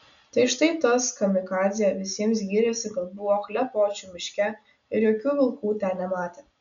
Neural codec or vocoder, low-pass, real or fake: none; 7.2 kHz; real